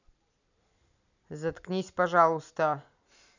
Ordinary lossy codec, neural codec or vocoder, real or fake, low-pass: none; none; real; 7.2 kHz